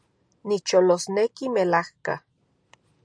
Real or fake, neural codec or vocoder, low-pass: real; none; 9.9 kHz